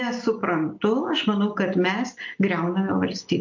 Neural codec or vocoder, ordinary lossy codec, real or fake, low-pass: none; MP3, 48 kbps; real; 7.2 kHz